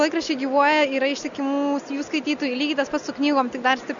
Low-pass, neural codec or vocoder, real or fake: 7.2 kHz; none; real